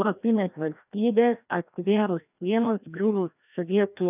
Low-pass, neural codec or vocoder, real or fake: 3.6 kHz; codec, 16 kHz, 1 kbps, FreqCodec, larger model; fake